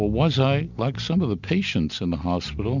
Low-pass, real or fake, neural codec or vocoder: 7.2 kHz; real; none